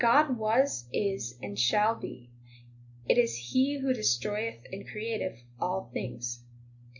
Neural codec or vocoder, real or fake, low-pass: none; real; 7.2 kHz